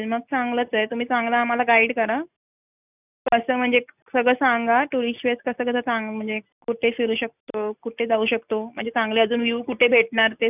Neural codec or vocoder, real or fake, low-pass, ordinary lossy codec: none; real; 3.6 kHz; none